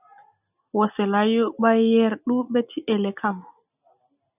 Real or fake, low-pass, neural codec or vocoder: real; 3.6 kHz; none